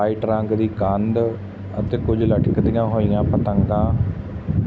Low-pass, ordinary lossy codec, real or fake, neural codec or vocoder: none; none; real; none